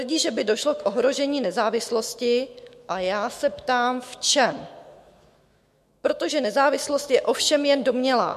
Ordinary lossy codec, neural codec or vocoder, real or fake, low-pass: MP3, 64 kbps; autoencoder, 48 kHz, 128 numbers a frame, DAC-VAE, trained on Japanese speech; fake; 14.4 kHz